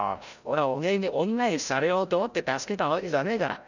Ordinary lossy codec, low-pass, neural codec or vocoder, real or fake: none; 7.2 kHz; codec, 16 kHz, 0.5 kbps, FreqCodec, larger model; fake